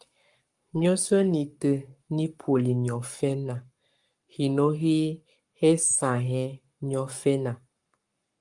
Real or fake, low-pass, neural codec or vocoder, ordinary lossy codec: fake; 10.8 kHz; codec, 44.1 kHz, 7.8 kbps, Pupu-Codec; Opus, 32 kbps